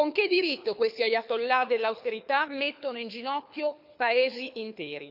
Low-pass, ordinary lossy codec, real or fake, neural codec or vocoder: 5.4 kHz; none; fake; codec, 24 kHz, 6 kbps, HILCodec